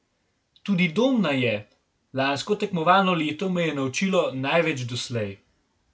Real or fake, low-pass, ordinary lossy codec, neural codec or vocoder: real; none; none; none